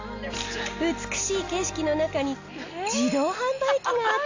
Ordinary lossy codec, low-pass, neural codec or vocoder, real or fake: AAC, 48 kbps; 7.2 kHz; none; real